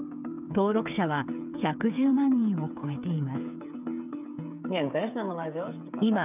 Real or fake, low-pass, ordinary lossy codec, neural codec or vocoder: fake; 3.6 kHz; none; codec, 16 kHz, 8 kbps, FreqCodec, smaller model